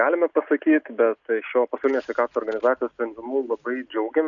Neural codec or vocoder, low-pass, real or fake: none; 7.2 kHz; real